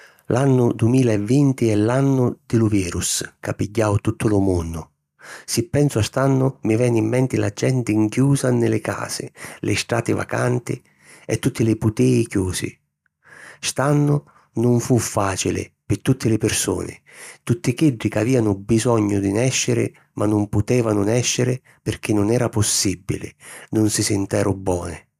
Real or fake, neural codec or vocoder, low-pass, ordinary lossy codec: real; none; 14.4 kHz; none